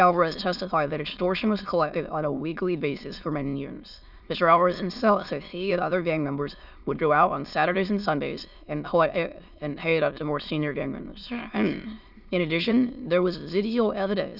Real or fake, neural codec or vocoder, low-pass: fake; autoencoder, 22.05 kHz, a latent of 192 numbers a frame, VITS, trained on many speakers; 5.4 kHz